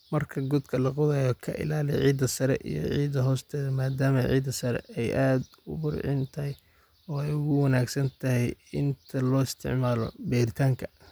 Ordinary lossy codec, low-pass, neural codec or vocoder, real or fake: none; none; none; real